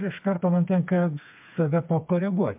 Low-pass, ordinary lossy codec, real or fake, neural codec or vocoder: 3.6 kHz; MP3, 32 kbps; fake; codec, 16 kHz, 4 kbps, FreqCodec, smaller model